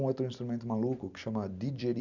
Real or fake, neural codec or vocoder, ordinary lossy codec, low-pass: fake; vocoder, 44.1 kHz, 128 mel bands every 256 samples, BigVGAN v2; none; 7.2 kHz